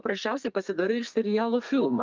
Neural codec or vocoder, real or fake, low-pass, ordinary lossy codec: codec, 32 kHz, 1.9 kbps, SNAC; fake; 7.2 kHz; Opus, 24 kbps